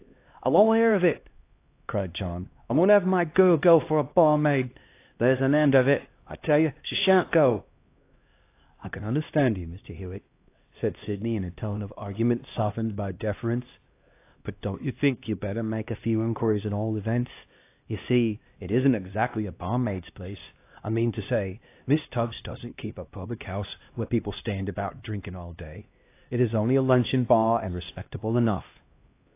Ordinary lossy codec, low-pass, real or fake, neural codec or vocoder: AAC, 24 kbps; 3.6 kHz; fake; codec, 16 kHz, 1 kbps, X-Codec, HuBERT features, trained on LibriSpeech